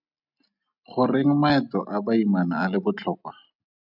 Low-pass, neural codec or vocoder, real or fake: 5.4 kHz; none; real